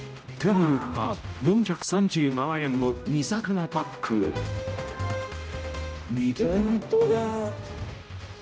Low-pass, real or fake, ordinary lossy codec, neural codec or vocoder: none; fake; none; codec, 16 kHz, 0.5 kbps, X-Codec, HuBERT features, trained on general audio